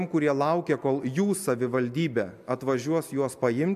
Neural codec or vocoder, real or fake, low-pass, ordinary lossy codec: none; real; 14.4 kHz; MP3, 96 kbps